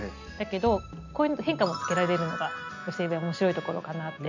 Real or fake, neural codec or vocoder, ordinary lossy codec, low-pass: real; none; none; 7.2 kHz